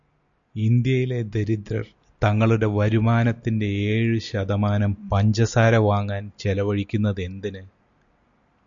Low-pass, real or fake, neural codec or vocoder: 7.2 kHz; real; none